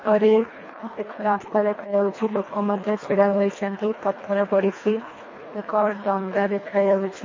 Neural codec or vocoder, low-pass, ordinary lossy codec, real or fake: codec, 24 kHz, 1.5 kbps, HILCodec; 7.2 kHz; MP3, 32 kbps; fake